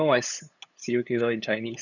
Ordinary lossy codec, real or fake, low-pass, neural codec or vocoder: none; fake; 7.2 kHz; codec, 16 kHz, 8 kbps, FunCodec, trained on LibriTTS, 25 frames a second